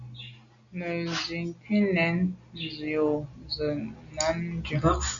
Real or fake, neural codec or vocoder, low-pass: real; none; 7.2 kHz